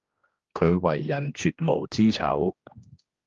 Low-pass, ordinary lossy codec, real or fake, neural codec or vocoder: 7.2 kHz; Opus, 32 kbps; fake; codec, 16 kHz, 1 kbps, X-Codec, HuBERT features, trained on general audio